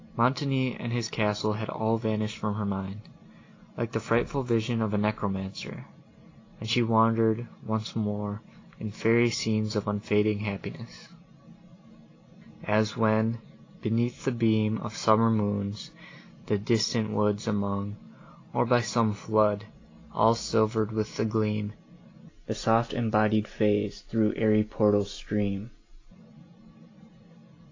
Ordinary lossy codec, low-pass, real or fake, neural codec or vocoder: AAC, 32 kbps; 7.2 kHz; real; none